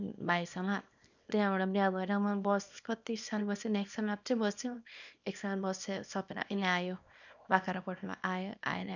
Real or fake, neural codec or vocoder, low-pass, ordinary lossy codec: fake; codec, 24 kHz, 0.9 kbps, WavTokenizer, small release; 7.2 kHz; none